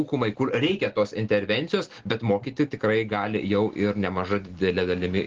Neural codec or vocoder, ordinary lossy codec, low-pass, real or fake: none; Opus, 16 kbps; 7.2 kHz; real